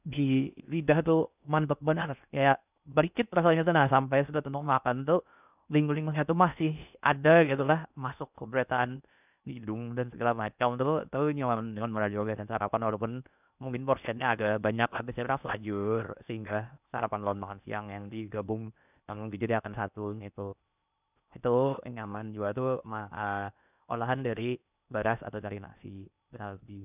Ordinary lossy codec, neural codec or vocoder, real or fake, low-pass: none; codec, 16 kHz in and 24 kHz out, 0.6 kbps, FocalCodec, streaming, 4096 codes; fake; 3.6 kHz